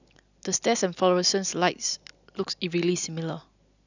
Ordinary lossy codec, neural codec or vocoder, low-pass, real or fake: none; none; 7.2 kHz; real